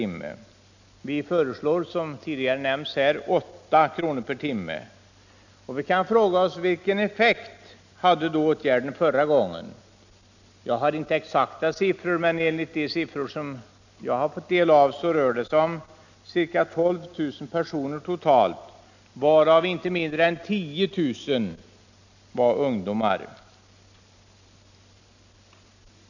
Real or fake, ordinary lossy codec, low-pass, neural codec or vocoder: real; none; 7.2 kHz; none